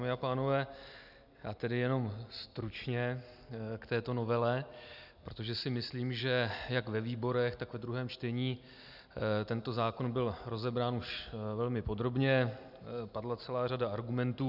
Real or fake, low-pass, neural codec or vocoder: real; 5.4 kHz; none